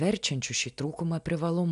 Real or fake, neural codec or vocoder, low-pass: real; none; 10.8 kHz